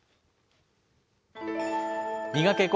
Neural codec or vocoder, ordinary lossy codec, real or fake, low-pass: none; none; real; none